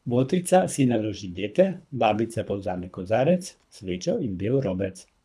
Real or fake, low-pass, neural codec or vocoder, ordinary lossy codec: fake; none; codec, 24 kHz, 3 kbps, HILCodec; none